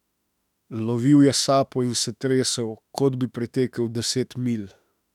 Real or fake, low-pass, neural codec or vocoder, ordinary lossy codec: fake; 19.8 kHz; autoencoder, 48 kHz, 32 numbers a frame, DAC-VAE, trained on Japanese speech; none